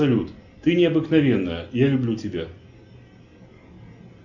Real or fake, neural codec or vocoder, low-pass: real; none; 7.2 kHz